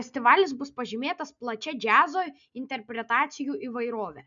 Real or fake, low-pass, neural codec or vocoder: real; 7.2 kHz; none